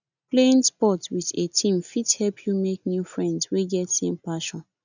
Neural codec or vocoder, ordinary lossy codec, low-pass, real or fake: none; none; 7.2 kHz; real